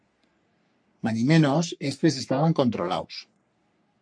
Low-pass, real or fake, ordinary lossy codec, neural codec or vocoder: 9.9 kHz; fake; MP3, 64 kbps; codec, 44.1 kHz, 3.4 kbps, Pupu-Codec